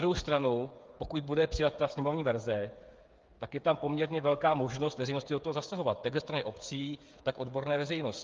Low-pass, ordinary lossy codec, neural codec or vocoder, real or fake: 7.2 kHz; Opus, 32 kbps; codec, 16 kHz, 8 kbps, FreqCodec, smaller model; fake